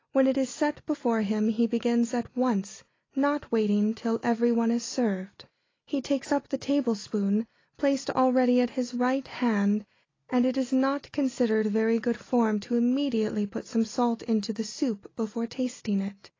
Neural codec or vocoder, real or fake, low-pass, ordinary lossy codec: none; real; 7.2 kHz; AAC, 32 kbps